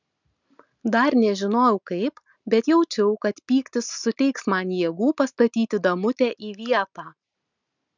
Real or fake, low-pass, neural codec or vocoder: real; 7.2 kHz; none